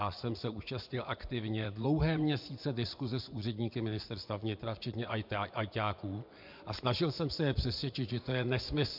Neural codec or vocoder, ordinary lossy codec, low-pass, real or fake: vocoder, 24 kHz, 100 mel bands, Vocos; MP3, 48 kbps; 5.4 kHz; fake